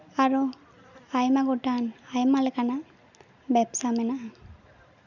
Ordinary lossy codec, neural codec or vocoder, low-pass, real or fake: none; none; 7.2 kHz; real